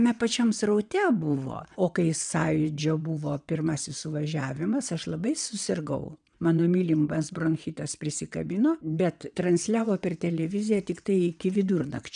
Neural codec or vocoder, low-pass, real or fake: vocoder, 22.05 kHz, 80 mel bands, WaveNeXt; 9.9 kHz; fake